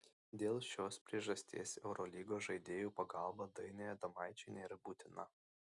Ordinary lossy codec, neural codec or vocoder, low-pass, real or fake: Opus, 64 kbps; none; 10.8 kHz; real